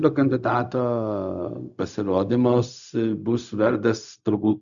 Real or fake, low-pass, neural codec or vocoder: fake; 7.2 kHz; codec, 16 kHz, 0.4 kbps, LongCat-Audio-Codec